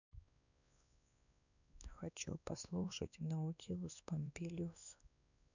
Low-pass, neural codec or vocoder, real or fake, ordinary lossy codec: 7.2 kHz; codec, 16 kHz, 2 kbps, X-Codec, WavLM features, trained on Multilingual LibriSpeech; fake; none